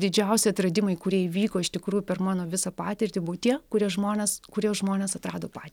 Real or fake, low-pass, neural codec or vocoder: real; 19.8 kHz; none